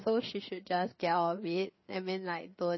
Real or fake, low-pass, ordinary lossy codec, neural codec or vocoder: fake; 7.2 kHz; MP3, 24 kbps; codec, 16 kHz, 4 kbps, FreqCodec, larger model